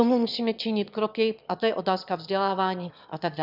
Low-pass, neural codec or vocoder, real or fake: 5.4 kHz; autoencoder, 22.05 kHz, a latent of 192 numbers a frame, VITS, trained on one speaker; fake